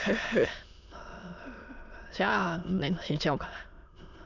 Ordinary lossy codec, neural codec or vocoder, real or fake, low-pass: none; autoencoder, 22.05 kHz, a latent of 192 numbers a frame, VITS, trained on many speakers; fake; 7.2 kHz